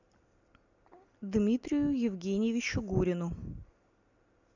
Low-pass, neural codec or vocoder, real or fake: 7.2 kHz; none; real